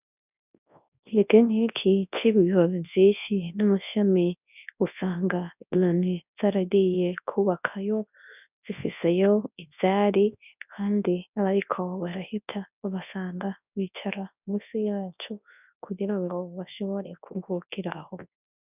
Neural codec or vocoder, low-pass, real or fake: codec, 24 kHz, 0.9 kbps, WavTokenizer, large speech release; 3.6 kHz; fake